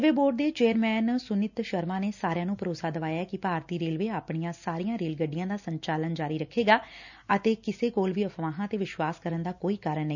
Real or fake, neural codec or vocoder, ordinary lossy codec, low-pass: real; none; none; 7.2 kHz